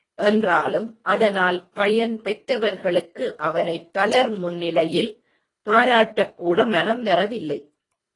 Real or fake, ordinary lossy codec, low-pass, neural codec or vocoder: fake; AAC, 32 kbps; 10.8 kHz; codec, 24 kHz, 1.5 kbps, HILCodec